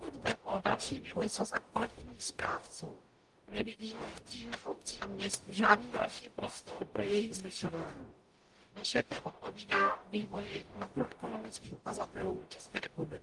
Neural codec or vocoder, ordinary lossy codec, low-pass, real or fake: codec, 44.1 kHz, 0.9 kbps, DAC; Opus, 24 kbps; 10.8 kHz; fake